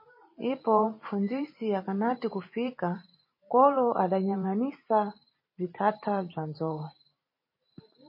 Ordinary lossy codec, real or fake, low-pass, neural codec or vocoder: MP3, 24 kbps; fake; 5.4 kHz; vocoder, 44.1 kHz, 128 mel bands every 512 samples, BigVGAN v2